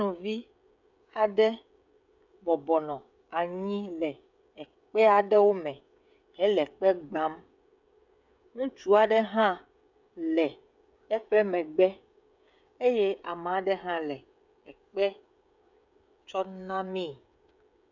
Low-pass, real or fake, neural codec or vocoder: 7.2 kHz; fake; codec, 16 kHz, 16 kbps, FreqCodec, smaller model